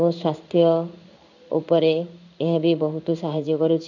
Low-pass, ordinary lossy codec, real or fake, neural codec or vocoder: 7.2 kHz; none; fake; codec, 16 kHz in and 24 kHz out, 1 kbps, XY-Tokenizer